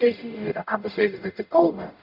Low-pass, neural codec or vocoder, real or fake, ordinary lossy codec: 5.4 kHz; codec, 44.1 kHz, 0.9 kbps, DAC; fake; none